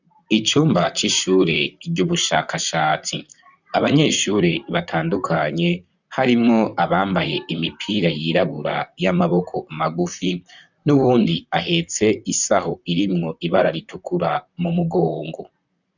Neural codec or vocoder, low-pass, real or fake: vocoder, 44.1 kHz, 128 mel bands, Pupu-Vocoder; 7.2 kHz; fake